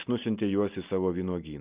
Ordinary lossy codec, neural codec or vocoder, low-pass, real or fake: Opus, 32 kbps; none; 3.6 kHz; real